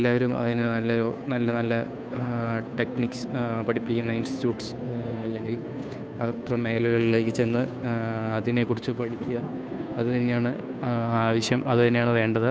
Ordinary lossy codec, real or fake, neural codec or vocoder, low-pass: none; fake; codec, 16 kHz, 2 kbps, FunCodec, trained on Chinese and English, 25 frames a second; none